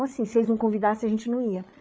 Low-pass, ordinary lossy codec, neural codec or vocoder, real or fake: none; none; codec, 16 kHz, 8 kbps, FreqCodec, larger model; fake